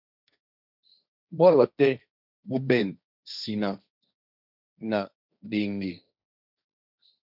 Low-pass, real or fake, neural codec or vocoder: 5.4 kHz; fake; codec, 16 kHz, 1.1 kbps, Voila-Tokenizer